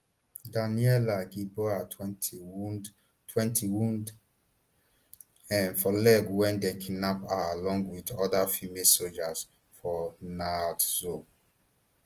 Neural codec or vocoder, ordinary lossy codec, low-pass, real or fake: none; Opus, 24 kbps; 14.4 kHz; real